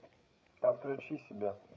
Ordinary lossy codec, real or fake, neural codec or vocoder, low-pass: none; fake; codec, 16 kHz, 8 kbps, FreqCodec, larger model; none